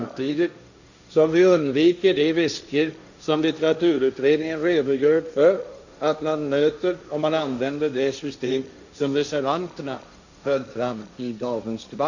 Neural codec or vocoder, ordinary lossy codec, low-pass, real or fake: codec, 16 kHz, 1.1 kbps, Voila-Tokenizer; none; 7.2 kHz; fake